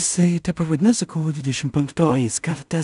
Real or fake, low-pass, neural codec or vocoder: fake; 10.8 kHz; codec, 16 kHz in and 24 kHz out, 0.4 kbps, LongCat-Audio-Codec, two codebook decoder